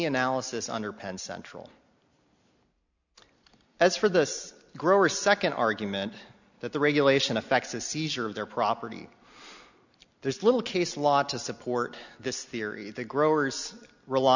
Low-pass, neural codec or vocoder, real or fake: 7.2 kHz; none; real